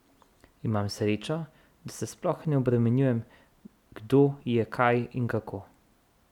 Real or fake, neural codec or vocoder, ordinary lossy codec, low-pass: real; none; none; 19.8 kHz